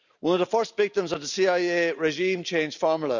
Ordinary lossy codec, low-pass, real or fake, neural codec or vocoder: none; 7.2 kHz; real; none